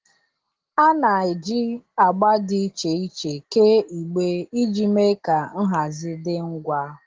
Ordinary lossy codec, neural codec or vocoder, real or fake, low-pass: Opus, 16 kbps; none; real; 7.2 kHz